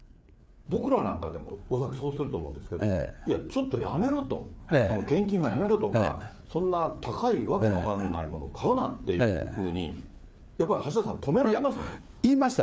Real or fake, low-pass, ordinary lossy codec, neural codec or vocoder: fake; none; none; codec, 16 kHz, 4 kbps, FreqCodec, larger model